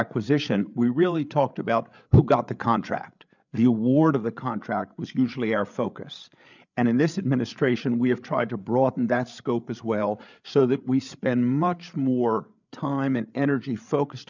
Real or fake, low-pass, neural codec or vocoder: fake; 7.2 kHz; codec, 16 kHz, 8 kbps, FreqCodec, larger model